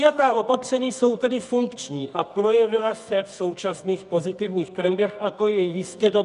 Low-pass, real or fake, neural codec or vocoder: 10.8 kHz; fake; codec, 24 kHz, 0.9 kbps, WavTokenizer, medium music audio release